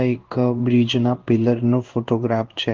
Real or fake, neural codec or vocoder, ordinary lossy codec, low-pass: fake; codec, 16 kHz in and 24 kHz out, 1 kbps, XY-Tokenizer; Opus, 16 kbps; 7.2 kHz